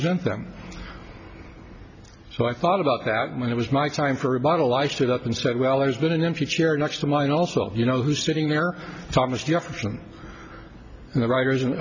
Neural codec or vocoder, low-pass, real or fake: none; 7.2 kHz; real